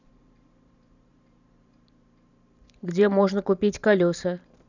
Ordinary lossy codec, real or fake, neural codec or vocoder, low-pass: none; fake; vocoder, 44.1 kHz, 128 mel bands every 512 samples, BigVGAN v2; 7.2 kHz